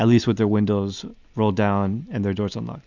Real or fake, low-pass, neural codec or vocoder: real; 7.2 kHz; none